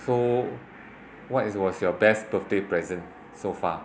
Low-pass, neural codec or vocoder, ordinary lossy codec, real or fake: none; none; none; real